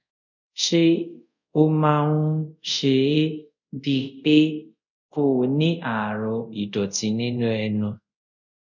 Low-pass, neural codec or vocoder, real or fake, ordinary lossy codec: 7.2 kHz; codec, 24 kHz, 0.5 kbps, DualCodec; fake; none